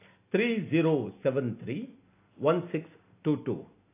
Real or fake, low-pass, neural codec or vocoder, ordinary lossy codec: real; 3.6 kHz; none; AAC, 24 kbps